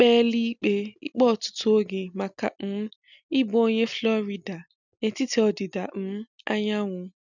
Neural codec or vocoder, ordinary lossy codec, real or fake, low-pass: none; none; real; 7.2 kHz